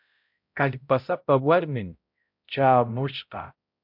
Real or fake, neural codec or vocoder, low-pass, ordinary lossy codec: fake; codec, 16 kHz, 0.5 kbps, X-Codec, HuBERT features, trained on balanced general audio; 5.4 kHz; MP3, 48 kbps